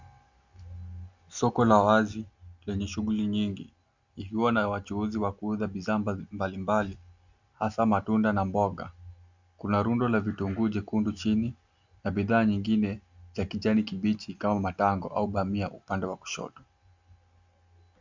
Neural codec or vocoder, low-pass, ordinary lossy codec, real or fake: none; 7.2 kHz; Opus, 64 kbps; real